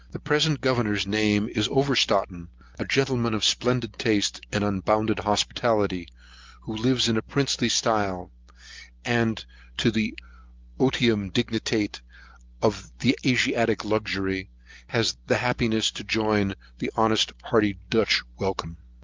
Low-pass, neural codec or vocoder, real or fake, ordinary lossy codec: 7.2 kHz; none; real; Opus, 32 kbps